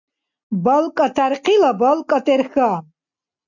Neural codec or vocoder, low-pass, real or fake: none; 7.2 kHz; real